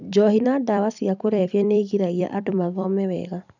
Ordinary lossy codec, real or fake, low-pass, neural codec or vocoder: none; fake; 7.2 kHz; vocoder, 44.1 kHz, 128 mel bands every 256 samples, BigVGAN v2